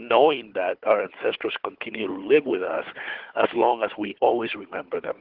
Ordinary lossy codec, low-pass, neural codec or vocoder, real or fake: Opus, 16 kbps; 5.4 kHz; codec, 16 kHz, 4 kbps, FunCodec, trained on Chinese and English, 50 frames a second; fake